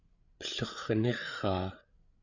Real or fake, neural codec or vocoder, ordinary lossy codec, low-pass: fake; codec, 16 kHz, 8 kbps, FreqCodec, larger model; Opus, 64 kbps; 7.2 kHz